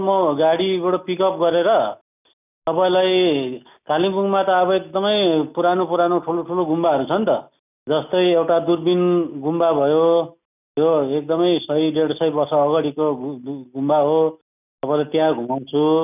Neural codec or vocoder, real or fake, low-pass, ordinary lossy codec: none; real; 3.6 kHz; none